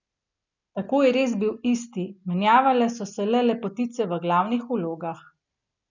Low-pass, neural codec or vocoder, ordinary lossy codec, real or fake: 7.2 kHz; none; none; real